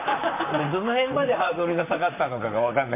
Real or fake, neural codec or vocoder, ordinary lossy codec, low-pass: fake; vocoder, 44.1 kHz, 128 mel bands, Pupu-Vocoder; MP3, 24 kbps; 3.6 kHz